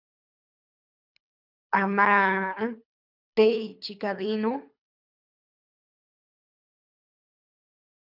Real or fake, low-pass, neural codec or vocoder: fake; 5.4 kHz; codec, 24 kHz, 3 kbps, HILCodec